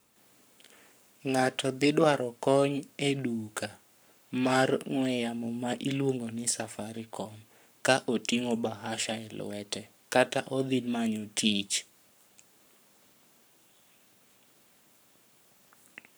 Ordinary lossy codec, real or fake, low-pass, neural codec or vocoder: none; fake; none; codec, 44.1 kHz, 7.8 kbps, Pupu-Codec